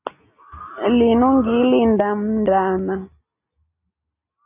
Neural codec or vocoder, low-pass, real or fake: none; 3.6 kHz; real